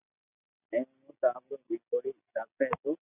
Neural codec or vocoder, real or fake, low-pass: none; real; 3.6 kHz